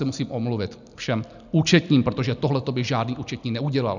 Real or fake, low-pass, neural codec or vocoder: real; 7.2 kHz; none